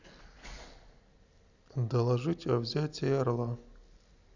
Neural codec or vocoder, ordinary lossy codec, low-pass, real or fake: none; Opus, 64 kbps; 7.2 kHz; real